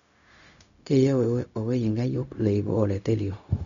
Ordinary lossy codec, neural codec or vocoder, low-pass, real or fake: none; codec, 16 kHz, 0.4 kbps, LongCat-Audio-Codec; 7.2 kHz; fake